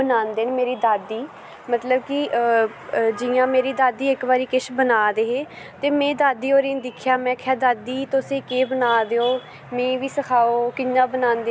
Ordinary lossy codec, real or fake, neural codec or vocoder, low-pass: none; real; none; none